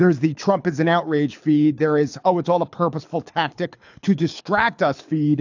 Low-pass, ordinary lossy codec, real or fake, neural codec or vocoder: 7.2 kHz; AAC, 48 kbps; fake; codec, 24 kHz, 6 kbps, HILCodec